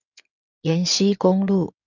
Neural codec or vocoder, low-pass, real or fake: codec, 16 kHz in and 24 kHz out, 2.2 kbps, FireRedTTS-2 codec; 7.2 kHz; fake